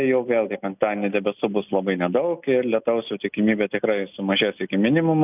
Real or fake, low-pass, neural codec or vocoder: real; 3.6 kHz; none